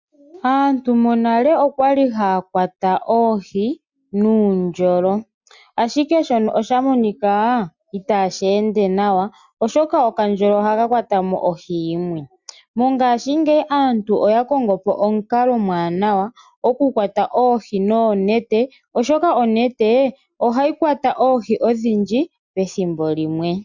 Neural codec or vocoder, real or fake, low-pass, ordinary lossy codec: none; real; 7.2 kHz; Opus, 64 kbps